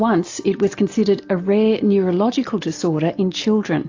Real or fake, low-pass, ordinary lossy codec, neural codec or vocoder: real; 7.2 kHz; AAC, 48 kbps; none